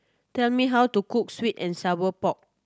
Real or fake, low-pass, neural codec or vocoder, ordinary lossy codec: real; none; none; none